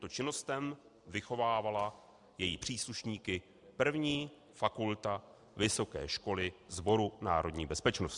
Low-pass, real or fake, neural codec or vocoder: 10.8 kHz; real; none